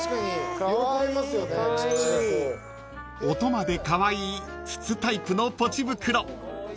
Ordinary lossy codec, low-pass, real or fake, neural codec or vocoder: none; none; real; none